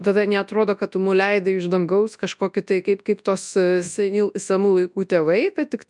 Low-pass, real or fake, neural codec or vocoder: 10.8 kHz; fake; codec, 24 kHz, 0.9 kbps, WavTokenizer, large speech release